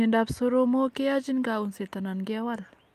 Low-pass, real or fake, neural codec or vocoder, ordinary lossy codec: 14.4 kHz; real; none; Opus, 32 kbps